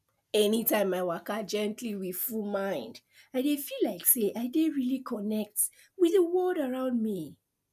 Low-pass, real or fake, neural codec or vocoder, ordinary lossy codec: 14.4 kHz; real; none; none